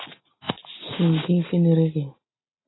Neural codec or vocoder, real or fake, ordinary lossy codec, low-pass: none; real; AAC, 16 kbps; 7.2 kHz